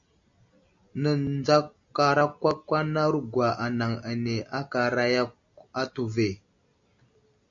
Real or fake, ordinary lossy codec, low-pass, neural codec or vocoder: real; AAC, 64 kbps; 7.2 kHz; none